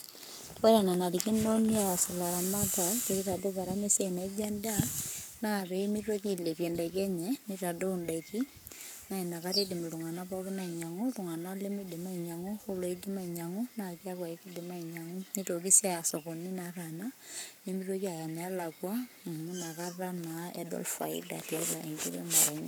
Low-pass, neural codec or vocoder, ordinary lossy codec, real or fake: none; codec, 44.1 kHz, 7.8 kbps, Pupu-Codec; none; fake